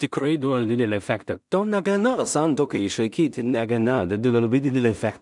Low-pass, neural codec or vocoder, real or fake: 10.8 kHz; codec, 16 kHz in and 24 kHz out, 0.4 kbps, LongCat-Audio-Codec, two codebook decoder; fake